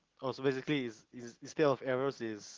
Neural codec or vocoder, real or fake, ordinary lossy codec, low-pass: none; real; Opus, 16 kbps; 7.2 kHz